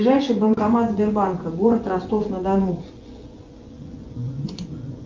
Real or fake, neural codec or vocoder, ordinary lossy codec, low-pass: fake; vocoder, 24 kHz, 100 mel bands, Vocos; Opus, 24 kbps; 7.2 kHz